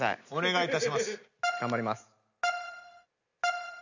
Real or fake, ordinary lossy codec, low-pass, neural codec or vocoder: real; none; 7.2 kHz; none